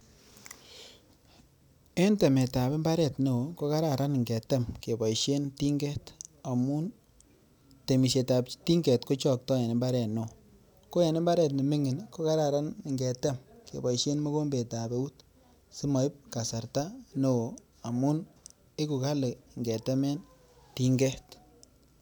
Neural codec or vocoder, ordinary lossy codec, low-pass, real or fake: none; none; none; real